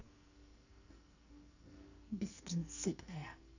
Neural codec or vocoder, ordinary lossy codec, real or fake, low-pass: codec, 44.1 kHz, 2.6 kbps, SNAC; none; fake; 7.2 kHz